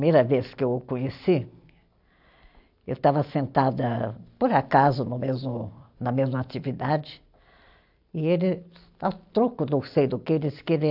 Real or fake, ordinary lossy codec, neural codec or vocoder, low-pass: real; AAC, 48 kbps; none; 5.4 kHz